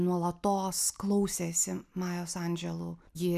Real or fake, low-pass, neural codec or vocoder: real; 14.4 kHz; none